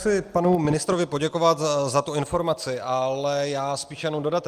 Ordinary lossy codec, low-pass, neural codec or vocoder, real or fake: Opus, 32 kbps; 14.4 kHz; none; real